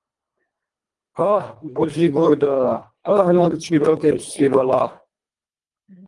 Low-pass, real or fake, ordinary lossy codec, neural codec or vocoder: 10.8 kHz; fake; Opus, 32 kbps; codec, 24 kHz, 1.5 kbps, HILCodec